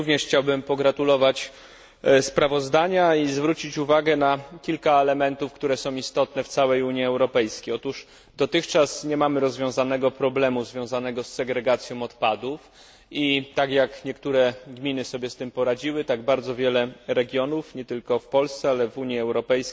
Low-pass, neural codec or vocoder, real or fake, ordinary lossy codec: none; none; real; none